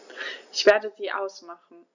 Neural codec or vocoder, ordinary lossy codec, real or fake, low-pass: none; none; real; 7.2 kHz